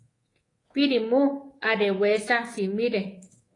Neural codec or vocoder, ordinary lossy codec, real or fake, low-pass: codec, 24 kHz, 3.1 kbps, DualCodec; AAC, 32 kbps; fake; 10.8 kHz